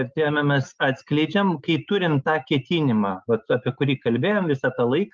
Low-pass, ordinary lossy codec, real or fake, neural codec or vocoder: 7.2 kHz; Opus, 24 kbps; real; none